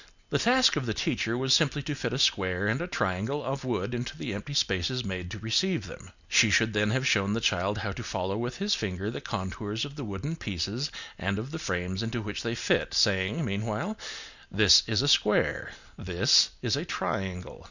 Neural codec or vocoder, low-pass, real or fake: none; 7.2 kHz; real